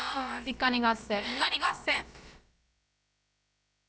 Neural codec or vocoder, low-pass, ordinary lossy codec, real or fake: codec, 16 kHz, about 1 kbps, DyCAST, with the encoder's durations; none; none; fake